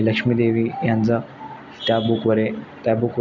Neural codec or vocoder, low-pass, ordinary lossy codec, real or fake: none; 7.2 kHz; none; real